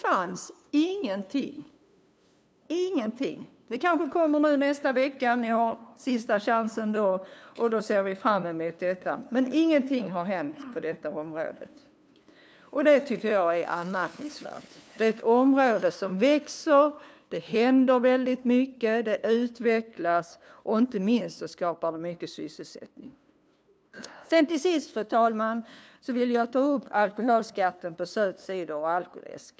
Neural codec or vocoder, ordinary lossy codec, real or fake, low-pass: codec, 16 kHz, 2 kbps, FunCodec, trained on LibriTTS, 25 frames a second; none; fake; none